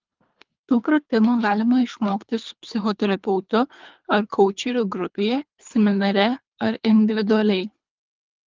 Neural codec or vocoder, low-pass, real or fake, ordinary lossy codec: codec, 24 kHz, 3 kbps, HILCodec; 7.2 kHz; fake; Opus, 24 kbps